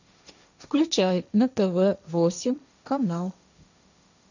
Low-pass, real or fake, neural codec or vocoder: 7.2 kHz; fake; codec, 16 kHz, 1.1 kbps, Voila-Tokenizer